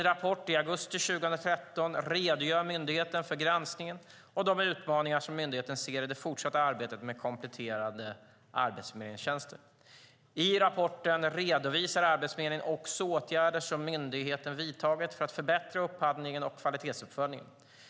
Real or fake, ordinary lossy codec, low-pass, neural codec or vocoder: real; none; none; none